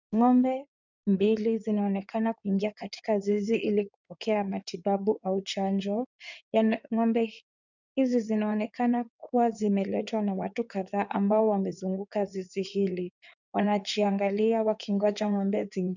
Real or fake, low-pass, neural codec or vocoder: fake; 7.2 kHz; codec, 16 kHz in and 24 kHz out, 2.2 kbps, FireRedTTS-2 codec